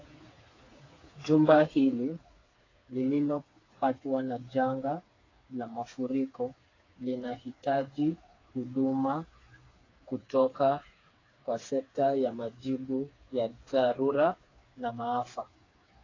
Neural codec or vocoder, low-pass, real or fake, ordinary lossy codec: codec, 16 kHz, 4 kbps, FreqCodec, smaller model; 7.2 kHz; fake; AAC, 32 kbps